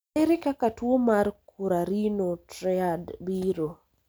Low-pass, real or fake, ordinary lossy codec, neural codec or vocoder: none; real; none; none